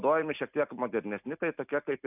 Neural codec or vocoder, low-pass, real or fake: none; 3.6 kHz; real